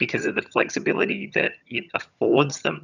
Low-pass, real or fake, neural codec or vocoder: 7.2 kHz; fake; vocoder, 22.05 kHz, 80 mel bands, HiFi-GAN